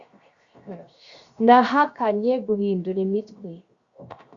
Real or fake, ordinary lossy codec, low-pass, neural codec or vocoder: fake; Opus, 64 kbps; 7.2 kHz; codec, 16 kHz, 0.7 kbps, FocalCodec